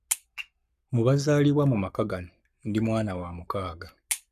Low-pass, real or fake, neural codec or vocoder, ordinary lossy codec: 14.4 kHz; fake; codec, 44.1 kHz, 7.8 kbps, Pupu-Codec; none